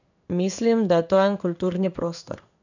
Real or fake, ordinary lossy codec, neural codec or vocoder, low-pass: fake; none; codec, 16 kHz in and 24 kHz out, 1 kbps, XY-Tokenizer; 7.2 kHz